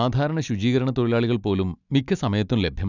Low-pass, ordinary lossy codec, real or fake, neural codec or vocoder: 7.2 kHz; none; real; none